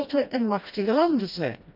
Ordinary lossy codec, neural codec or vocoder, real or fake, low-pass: none; codec, 16 kHz, 1 kbps, FreqCodec, smaller model; fake; 5.4 kHz